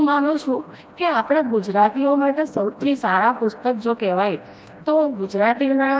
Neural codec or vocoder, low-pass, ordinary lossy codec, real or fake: codec, 16 kHz, 1 kbps, FreqCodec, smaller model; none; none; fake